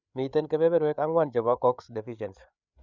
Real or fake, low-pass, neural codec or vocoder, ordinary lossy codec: fake; 7.2 kHz; codec, 16 kHz, 8 kbps, FreqCodec, larger model; none